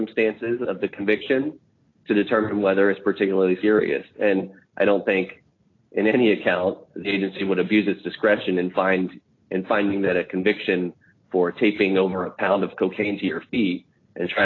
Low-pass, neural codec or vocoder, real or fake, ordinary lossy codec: 7.2 kHz; none; real; AAC, 32 kbps